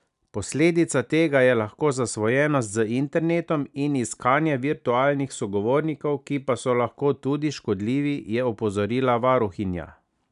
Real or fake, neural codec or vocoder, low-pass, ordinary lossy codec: real; none; 10.8 kHz; none